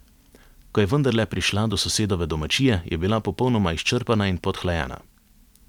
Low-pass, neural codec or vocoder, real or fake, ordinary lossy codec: 19.8 kHz; none; real; none